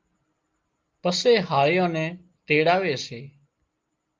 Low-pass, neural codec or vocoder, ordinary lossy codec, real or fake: 7.2 kHz; none; Opus, 24 kbps; real